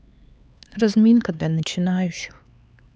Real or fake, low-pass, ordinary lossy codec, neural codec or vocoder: fake; none; none; codec, 16 kHz, 4 kbps, X-Codec, HuBERT features, trained on LibriSpeech